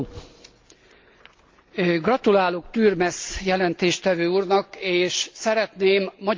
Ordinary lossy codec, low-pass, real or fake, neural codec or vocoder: Opus, 24 kbps; 7.2 kHz; real; none